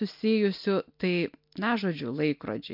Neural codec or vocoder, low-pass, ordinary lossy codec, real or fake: none; 5.4 kHz; MP3, 48 kbps; real